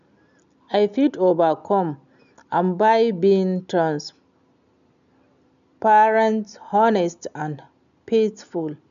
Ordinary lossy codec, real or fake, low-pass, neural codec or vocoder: none; real; 7.2 kHz; none